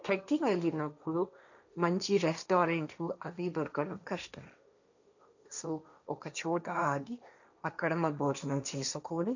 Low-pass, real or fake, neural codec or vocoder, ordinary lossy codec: 7.2 kHz; fake; codec, 16 kHz, 1.1 kbps, Voila-Tokenizer; none